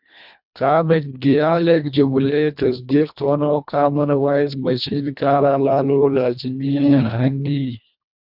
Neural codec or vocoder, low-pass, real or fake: codec, 24 kHz, 1.5 kbps, HILCodec; 5.4 kHz; fake